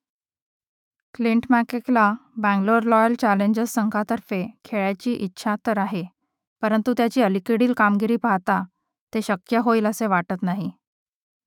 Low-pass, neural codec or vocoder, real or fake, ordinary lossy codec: 19.8 kHz; autoencoder, 48 kHz, 128 numbers a frame, DAC-VAE, trained on Japanese speech; fake; none